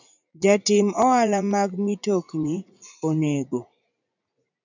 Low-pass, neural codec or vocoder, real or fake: 7.2 kHz; vocoder, 44.1 kHz, 80 mel bands, Vocos; fake